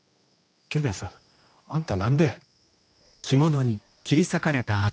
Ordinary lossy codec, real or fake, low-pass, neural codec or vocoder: none; fake; none; codec, 16 kHz, 1 kbps, X-Codec, HuBERT features, trained on general audio